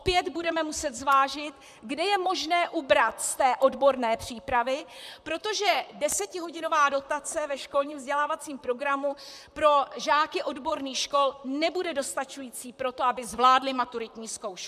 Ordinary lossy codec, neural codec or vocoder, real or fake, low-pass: MP3, 96 kbps; vocoder, 44.1 kHz, 128 mel bands, Pupu-Vocoder; fake; 14.4 kHz